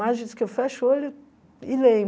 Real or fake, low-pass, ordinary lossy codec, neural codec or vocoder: real; none; none; none